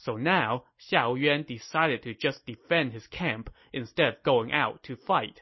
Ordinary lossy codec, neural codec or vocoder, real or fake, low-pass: MP3, 24 kbps; none; real; 7.2 kHz